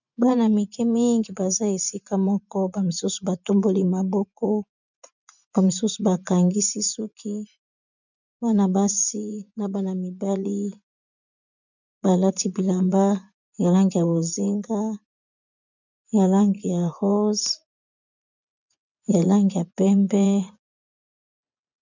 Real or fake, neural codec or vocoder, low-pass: fake; vocoder, 24 kHz, 100 mel bands, Vocos; 7.2 kHz